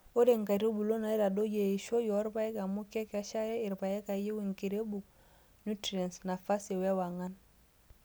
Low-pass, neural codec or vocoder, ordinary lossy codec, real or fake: none; none; none; real